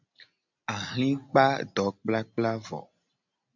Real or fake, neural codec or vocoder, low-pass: real; none; 7.2 kHz